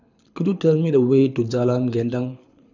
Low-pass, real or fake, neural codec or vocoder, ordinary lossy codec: 7.2 kHz; fake; codec, 24 kHz, 6 kbps, HILCodec; none